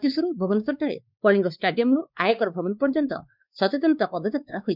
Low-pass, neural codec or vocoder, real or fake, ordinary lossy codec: 5.4 kHz; codec, 16 kHz, 2 kbps, X-Codec, WavLM features, trained on Multilingual LibriSpeech; fake; none